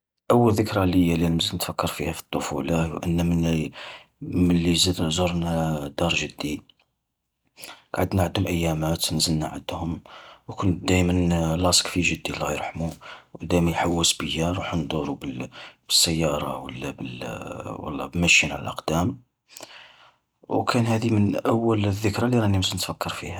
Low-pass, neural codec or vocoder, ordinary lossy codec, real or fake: none; none; none; real